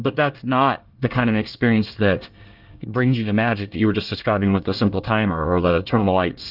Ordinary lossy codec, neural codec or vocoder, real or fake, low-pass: Opus, 32 kbps; codec, 24 kHz, 1 kbps, SNAC; fake; 5.4 kHz